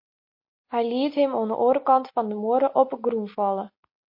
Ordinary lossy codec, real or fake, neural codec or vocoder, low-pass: MP3, 32 kbps; real; none; 5.4 kHz